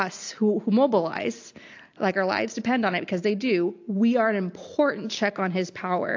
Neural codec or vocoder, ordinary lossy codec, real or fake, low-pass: none; AAC, 48 kbps; real; 7.2 kHz